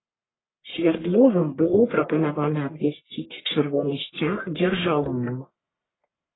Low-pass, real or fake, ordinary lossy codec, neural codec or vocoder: 7.2 kHz; fake; AAC, 16 kbps; codec, 44.1 kHz, 1.7 kbps, Pupu-Codec